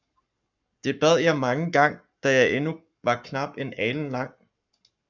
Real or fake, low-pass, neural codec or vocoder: fake; 7.2 kHz; codec, 44.1 kHz, 7.8 kbps, Pupu-Codec